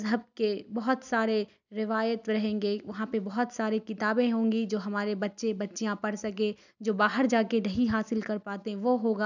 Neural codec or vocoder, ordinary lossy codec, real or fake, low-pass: none; none; real; 7.2 kHz